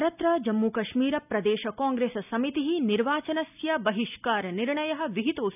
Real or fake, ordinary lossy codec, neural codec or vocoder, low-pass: real; none; none; 3.6 kHz